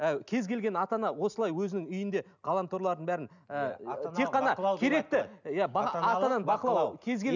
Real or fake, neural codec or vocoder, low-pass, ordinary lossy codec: real; none; 7.2 kHz; none